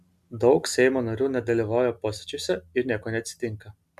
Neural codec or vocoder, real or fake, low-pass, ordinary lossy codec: none; real; 14.4 kHz; MP3, 96 kbps